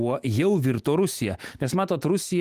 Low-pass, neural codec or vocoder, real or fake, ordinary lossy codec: 14.4 kHz; autoencoder, 48 kHz, 128 numbers a frame, DAC-VAE, trained on Japanese speech; fake; Opus, 24 kbps